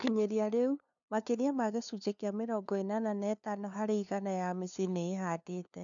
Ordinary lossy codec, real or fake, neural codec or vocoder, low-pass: none; fake; codec, 16 kHz, 2 kbps, FunCodec, trained on LibriTTS, 25 frames a second; 7.2 kHz